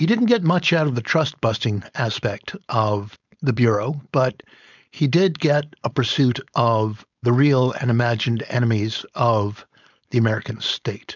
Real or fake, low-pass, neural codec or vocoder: fake; 7.2 kHz; codec, 16 kHz, 4.8 kbps, FACodec